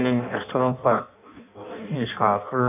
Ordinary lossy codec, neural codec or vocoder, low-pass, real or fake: none; codec, 16 kHz in and 24 kHz out, 0.6 kbps, FireRedTTS-2 codec; 3.6 kHz; fake